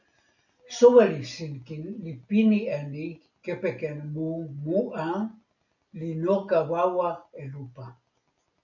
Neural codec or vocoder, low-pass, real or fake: none; 7.2 kHz; real